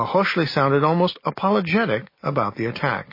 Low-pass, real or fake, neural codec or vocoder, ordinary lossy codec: 5.4 kHz; real; none; MP3, 24 kbps